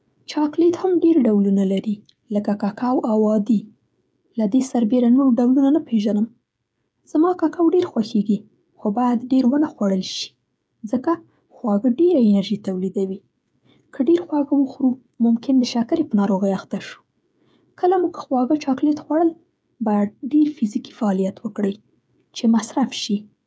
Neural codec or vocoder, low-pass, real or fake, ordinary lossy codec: codec, 16 kHz, 16 kbps, FreqCodec, smaller model; none; fake; none